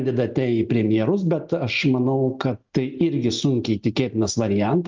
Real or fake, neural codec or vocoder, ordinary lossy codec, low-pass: real; none; Opus, 32 kbps; 7.2 kHz